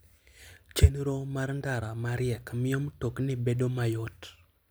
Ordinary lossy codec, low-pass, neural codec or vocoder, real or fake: none; none; none; real